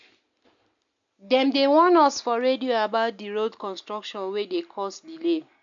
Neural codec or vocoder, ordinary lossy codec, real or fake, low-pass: none; AAC, 64 kbps; real; 7.2 kHz